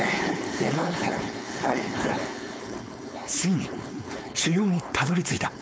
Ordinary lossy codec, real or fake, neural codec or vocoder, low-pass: none; fake; codec, 16 kHz, 4.8 kbps, FACodec; none